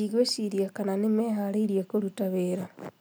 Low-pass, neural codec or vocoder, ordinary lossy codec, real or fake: none; none; none; real